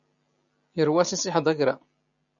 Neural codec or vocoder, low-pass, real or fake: none; 7.2 kHz; real